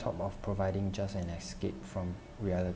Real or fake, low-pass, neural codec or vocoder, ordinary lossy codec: real; none; none; none